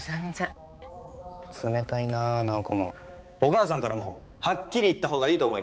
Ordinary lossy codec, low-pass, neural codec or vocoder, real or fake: none; none; codec, 16 kHz, 4 kbps, X-Codec, HuBERT features, trained on balanced general audio; fake